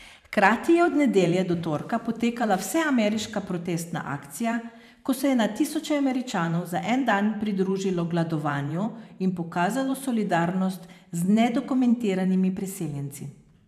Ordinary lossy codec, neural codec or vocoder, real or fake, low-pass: none; vocoder, 44.1 kHz, 128 mel bands every 512 samples, BigVGAN v2; fake; 14.4 kHz